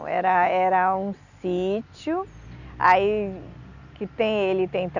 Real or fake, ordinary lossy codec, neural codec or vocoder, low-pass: real; none; none; 7.2 kHz